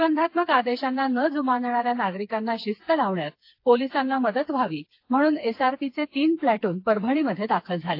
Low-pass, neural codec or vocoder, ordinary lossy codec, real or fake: 5.4 kHz; codec, 16 kHz, 4 kbps, FreqCodec, smaller model; AAC, 32 kbps; fake